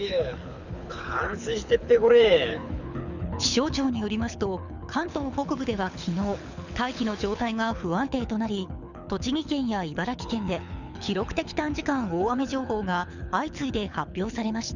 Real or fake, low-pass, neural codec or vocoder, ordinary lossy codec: fake; 7.2 kHz; codec, 24 kHz, 6 kbps, HILCodec; none